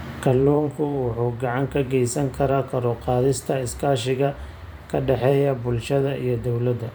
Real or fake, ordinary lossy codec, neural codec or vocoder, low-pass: fake; none; vocoder, 44.1 kHz, 128 mel bands every 512 samples, BigVGAN v2; none